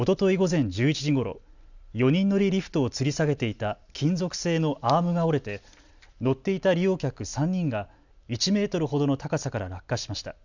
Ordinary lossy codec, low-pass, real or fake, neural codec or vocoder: none; 7.2 kHz; real; none